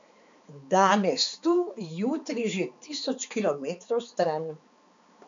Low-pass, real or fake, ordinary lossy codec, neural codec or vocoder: 7.2 kHz; fake; MP3, 64 kbps; codec, 16 kHz, 4 kbps, X-Codec, HuBERT features, trained on balanced general audio